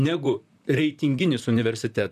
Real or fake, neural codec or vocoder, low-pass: fake; vocoder, 44.1 kHz, 128 mel bands, Pupu-Vocoder; 14.4 kHz